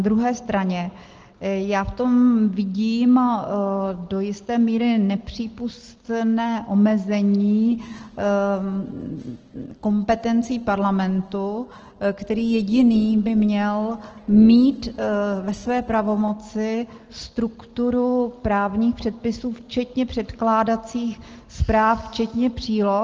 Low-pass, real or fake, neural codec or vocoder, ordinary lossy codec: 7.2 kHz; real; none; Opus, 16 kbps